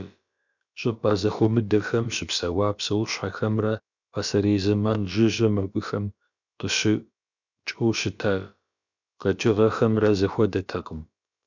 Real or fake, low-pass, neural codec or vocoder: fake; 7.2 kHz; codec, 16 kHz, about 1 kbps, DyCAST, with the encoder's durations